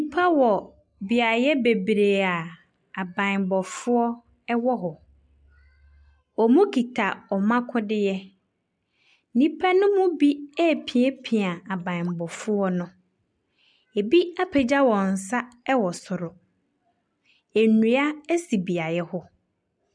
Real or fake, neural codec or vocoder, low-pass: real; none; 9.9 kHz